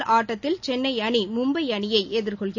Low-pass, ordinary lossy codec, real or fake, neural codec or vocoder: 7.2 kHz; none; real; none